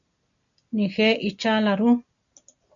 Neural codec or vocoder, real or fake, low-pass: none; real; 7.2 kHz